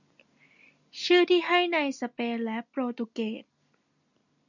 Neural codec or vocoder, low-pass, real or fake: none; 7.2 kHz; real